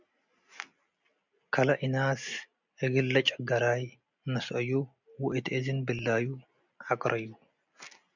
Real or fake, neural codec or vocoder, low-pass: real; none; 7.2 kHz